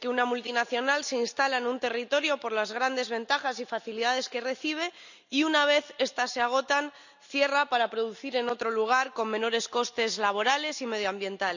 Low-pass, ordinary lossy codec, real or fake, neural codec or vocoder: 7.2 kHz; none; real; none